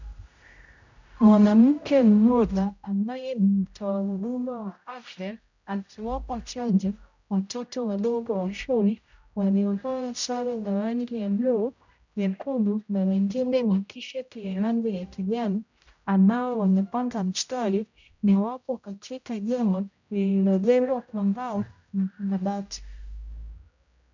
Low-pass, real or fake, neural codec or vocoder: 7.2 kHz; fake; codec, 16 kHz, 0.5 kbps, X-Codec, HuBERT features, trained on general audio